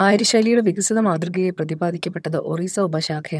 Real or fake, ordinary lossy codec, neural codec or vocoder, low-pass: fake; none; vocoder, 22.05 kHz, 80 mel bands, HiFi-GAN; none